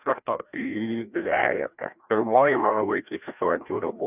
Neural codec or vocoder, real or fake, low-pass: codec, 16 kHz, 1 kbps, FreqCodec, larger model; fake; 3.6 kHz